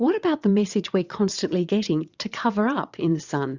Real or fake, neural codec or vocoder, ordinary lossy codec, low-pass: fake; vocoder, 22.05 kHz, 80 mel bands, WaveNeXt; Opus, 64 kbps; 7.2 kHz